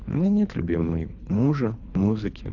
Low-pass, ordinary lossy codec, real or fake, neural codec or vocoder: 7.2 kHz; none; fake; codec, 24 kHz, 3 kbps, HILCodec